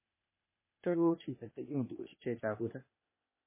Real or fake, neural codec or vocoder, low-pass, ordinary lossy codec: fake; codec, 16 kHz, 0.8 kbps, ZipCodec; 3.6 kHz; MP3, 16 kbps